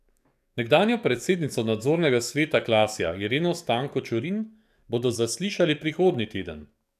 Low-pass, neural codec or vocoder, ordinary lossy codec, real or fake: 14.4 kHz; codec, 44.1 kHz, 7.8 kbps, DAC; none; fake